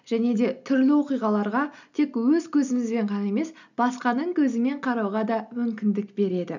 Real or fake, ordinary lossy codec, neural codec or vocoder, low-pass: real; none; none; 7.2 kHz